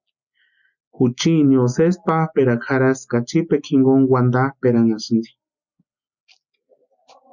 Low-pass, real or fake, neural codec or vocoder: 7.2 kHz; real; none